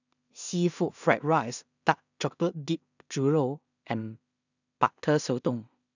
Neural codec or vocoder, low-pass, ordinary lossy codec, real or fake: codec, 16 kHz in and 24 kHz out, 0.4 kbps, LongCat-Audio-Codec, two codebook decoder; 7.2 kHz; none; fake